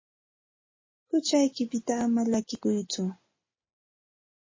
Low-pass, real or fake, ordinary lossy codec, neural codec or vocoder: 7.2 kHz; real; MP3, 32 kbps; none